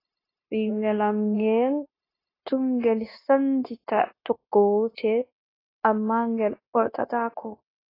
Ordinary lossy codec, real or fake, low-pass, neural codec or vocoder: AAC, 24 kbps; fake; 5.4 kHz; codec, 16 kHz, 0.9 kbps, LongCat-Audio-Codec